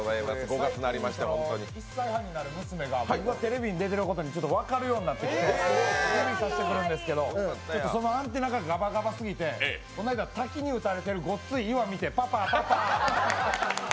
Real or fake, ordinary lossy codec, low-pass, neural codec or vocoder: real; none; none; none